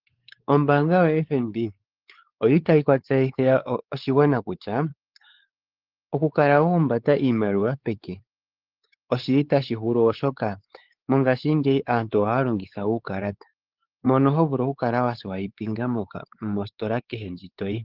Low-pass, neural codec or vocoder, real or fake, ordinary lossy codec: 5.4 kHz; codec, 16 kHz, 4 kbps, X-Codec, WavLM features, trained on Multilingual LibriSpeech; fake; Opus, 16 kbps